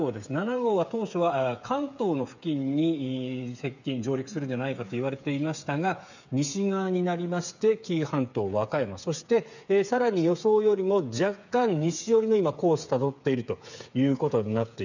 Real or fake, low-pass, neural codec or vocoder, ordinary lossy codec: fake; 7.2 kHz; codec, 16 kHz, 8 kbps, FreqCodec, smaller model; none